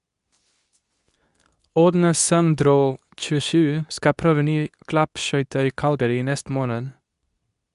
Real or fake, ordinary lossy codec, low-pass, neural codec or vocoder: fake; none; 10.8 kHz; codec, 24 kHz, 0.9 kbps, WavTokenizer, medium speech release version 2